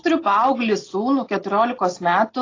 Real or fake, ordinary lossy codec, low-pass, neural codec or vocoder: real; AAC, 32 kbps; 7.2 kHz; none